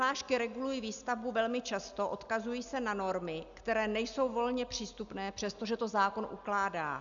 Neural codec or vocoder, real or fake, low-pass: none; real; 7.2 kHz